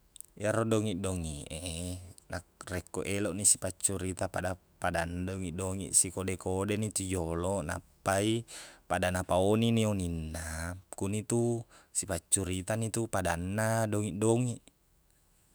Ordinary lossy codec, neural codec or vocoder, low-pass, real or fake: none; autoencoder, 48 kHz, 128 numbers a frame, DAC-VAE, trained on Japanese speech; none; fake